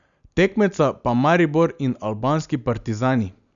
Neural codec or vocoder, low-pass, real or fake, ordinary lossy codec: none; 7.2 kHz; real; none